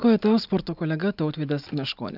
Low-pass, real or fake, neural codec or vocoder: 5.4 kHz; real; none